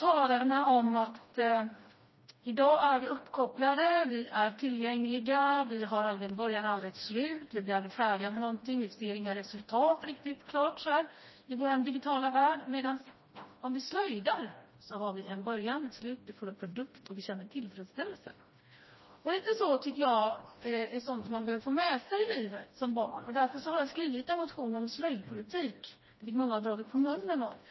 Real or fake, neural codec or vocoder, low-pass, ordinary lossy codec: fake; codec, 16 kHz, 1 kbps, FreqCodec, smaller model; 7.2 kHz; MP3, 24 kbps